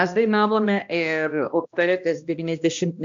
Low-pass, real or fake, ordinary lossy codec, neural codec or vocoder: 7.2 kHz; fake; AAC, 64 kbps; codec, 16 kHz, 1 kbps, X-Codec, HuBERT features, trained on balanced general audio